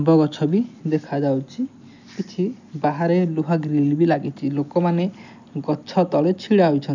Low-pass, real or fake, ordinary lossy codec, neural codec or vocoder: 7.2 kHz; real; none; none